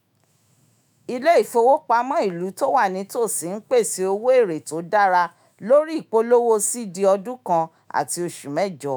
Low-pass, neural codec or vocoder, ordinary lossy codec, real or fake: none; autoencoder, 48 kHz, 128 numbers a frame, DAC-VAE, trained on Japanese speech; none; fake